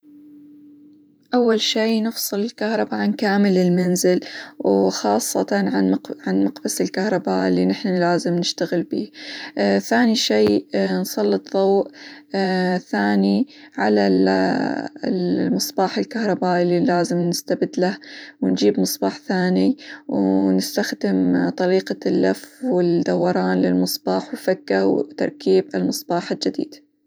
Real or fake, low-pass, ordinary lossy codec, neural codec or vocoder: fake; none; none; vocoder, 44.1 kHz, 128 mel bands every 512 samples, BigVGAN v2